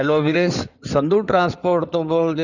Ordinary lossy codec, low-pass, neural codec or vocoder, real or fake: none; 7.2 kHz; codec, 16 kHz in and 24 kHz out, 2.2 kbps, FireRedTTS-2 codec; fake